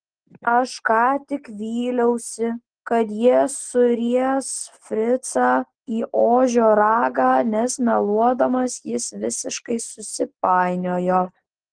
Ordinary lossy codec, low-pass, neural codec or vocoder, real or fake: Opus, 16 kbps; 9.9 kHz; none; real